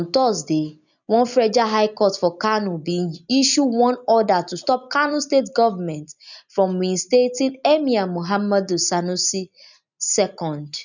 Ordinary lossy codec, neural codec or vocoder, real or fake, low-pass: none; none; real; 7.2 kHz